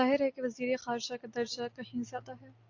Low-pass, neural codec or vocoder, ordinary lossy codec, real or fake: 7.2 kHz; none; AAC, 48 kbps; real